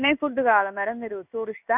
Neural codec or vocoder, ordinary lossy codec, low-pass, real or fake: codec, 16 kHz in and 24 kHz out, 1 kbps, XY-Tokenizer; none; 3.6 kHz; fake